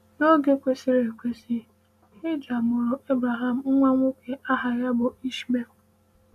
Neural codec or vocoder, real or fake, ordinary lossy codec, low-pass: none; real; none; 14.4 kHz